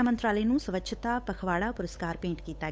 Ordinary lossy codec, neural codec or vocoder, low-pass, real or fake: none; codec, 16 kHz, 8 kbps, FunCodec, trained on Chinese and English, 25 frames a second; none; fake